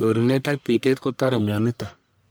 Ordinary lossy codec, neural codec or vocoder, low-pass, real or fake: none; codec, 44.1 kHz, 1.7 kbps, Pupu-Codec; none; fake